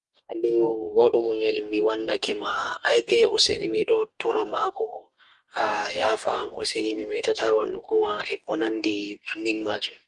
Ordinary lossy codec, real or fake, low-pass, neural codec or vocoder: Opus, 32 kbps; fake; 10.8 kHz; codec, 44.1 kHz, 2.6 kbps, DAC